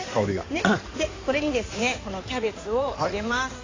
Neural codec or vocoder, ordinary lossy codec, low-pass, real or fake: codec, 16 kHz in and 24 kHz out, 2.2 kbps, FireRedTTS-2 codec; AAC, 32 kbps; 7.2 kHz; fake